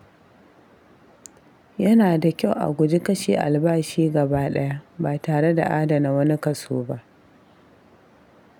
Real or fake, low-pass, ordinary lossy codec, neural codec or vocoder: real; none; none; none